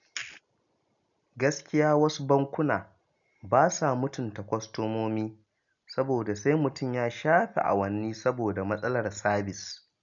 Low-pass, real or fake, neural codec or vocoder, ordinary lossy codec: 7.2 kHz; real; none; none